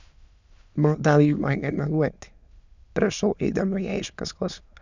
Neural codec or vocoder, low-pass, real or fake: autoencoder, 22.05 kHz, a latent of 192 numbers a frame, VITS, trained on many speakers; 7.2 kHz; fake